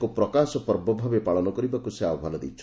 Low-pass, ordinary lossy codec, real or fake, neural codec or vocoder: none; none; real; none